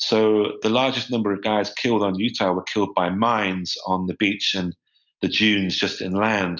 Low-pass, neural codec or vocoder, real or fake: 7.2 kHz; none; real